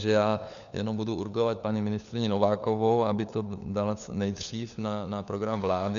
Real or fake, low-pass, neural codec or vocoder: fake; 7.2 kHz; codec, 16 kHz, 4 kbps, FunCodec, trained on LibriTTS, 50 frames a second